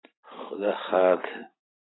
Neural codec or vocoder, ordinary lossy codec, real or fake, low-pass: none; AAC, 16 kbps; real; 7.2 kHz